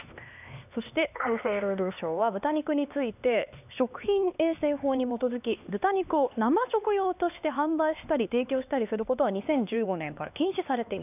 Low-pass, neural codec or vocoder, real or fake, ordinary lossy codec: 3.6 kHz; codec, 16 kHz, 2 kbps, X-Codec, HuBERT features, trained on LibriSpeech; fake; none